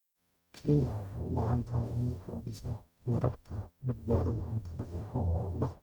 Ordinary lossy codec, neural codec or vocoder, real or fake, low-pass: none; codec, 44.1 kHz, 0.9 kbps, DAC; fake; 19.8 kHz